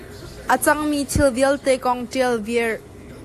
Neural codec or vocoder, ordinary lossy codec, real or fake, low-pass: none; AAC, 64 kbps; real; 14.4 kHz